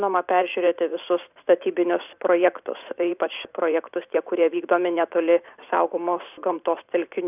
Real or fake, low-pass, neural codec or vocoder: fake; 3.6 kHz; vocoder, 44.1 kHz, 128 mel bands every 512 samples, BigVGAN v2